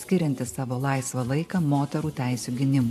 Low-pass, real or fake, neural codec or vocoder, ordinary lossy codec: 14.4 kHz; fake; vocoder, 44.1 kHz, 128 mel bands every 512 samples, BigVGAN v2; AAC, 96 kbps